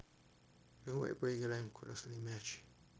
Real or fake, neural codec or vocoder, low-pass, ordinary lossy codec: fake; codec, 16 kHz, 0.9 kbps, LongCat-Audio-Codec; none; none